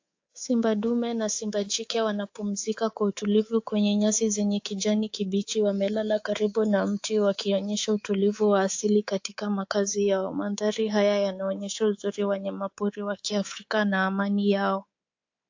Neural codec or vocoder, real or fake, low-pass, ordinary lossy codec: codec, 24 kHz, 3.1 kbps, DualCodec; fake; 7.2 kHz; AAC, 48 kbps